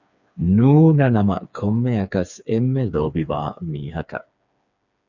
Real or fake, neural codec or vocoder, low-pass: fake; codec, 16 kHz, 4 kbps, FreqCodec, smaller model; 7.2 kHz